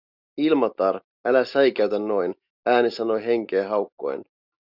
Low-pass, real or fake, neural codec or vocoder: 5.4 kHz; real; none